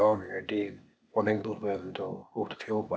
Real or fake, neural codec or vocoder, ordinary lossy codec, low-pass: fake; codec, 16 kHz, 0.8 kbps, ZipCodec; none; none